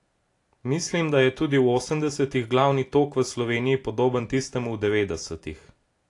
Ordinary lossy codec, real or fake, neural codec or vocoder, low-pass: AAC, 48 kbps; real; none; 10.8 kHz